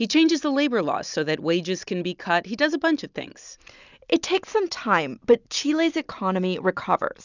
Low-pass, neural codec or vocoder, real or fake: 7.2 kHz; none; real